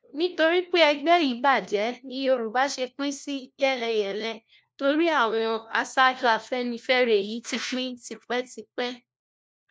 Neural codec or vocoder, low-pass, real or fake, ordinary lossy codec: codec, 16 kHz, 1 kbps, FunCodec, trained on LibriTTS, 50 frames a second; none; fake; none